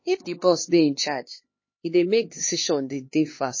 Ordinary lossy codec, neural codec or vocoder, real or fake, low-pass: MP3, 32 kbps; codec, 16 kHz, 2 kbps, X-Codec, HuBERT features, trained on LibriSpeech; fake; 7.2 kHz